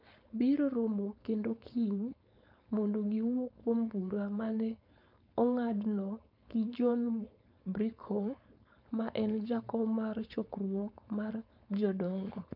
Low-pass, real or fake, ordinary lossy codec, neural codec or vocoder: 5.4 kHz; fake; MP3, 48 kbps; codec, 16 kHz, 4.8 kbps, FACodec